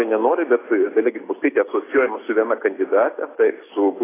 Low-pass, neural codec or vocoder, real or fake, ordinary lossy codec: 3.6 kHz; none; real; AAC, 16 kbps